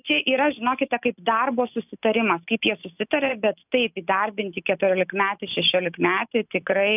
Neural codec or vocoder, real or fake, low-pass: none; real; 3.6 kHz